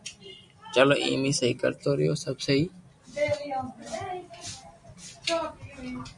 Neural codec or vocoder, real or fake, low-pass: none; real; 10.8 kHz